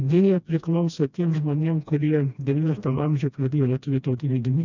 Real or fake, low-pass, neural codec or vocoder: fake; 7.2 kHz; codec, 16 kHz, 1 kbps, FreqCodec, smaller model